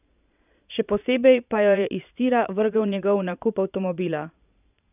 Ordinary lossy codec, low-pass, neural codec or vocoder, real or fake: none; 3.6 kHz; vocoder, 22.05 kHz, 80 mel bands, Vocos; fake